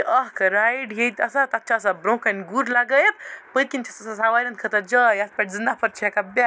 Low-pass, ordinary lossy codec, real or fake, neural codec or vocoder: none; none; real; none